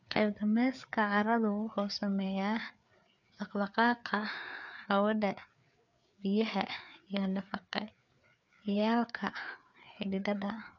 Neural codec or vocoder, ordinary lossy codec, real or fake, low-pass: codec, 16 kHz, 4 kbps, FreqCodec, larger model; none; fake; 7.2 kHz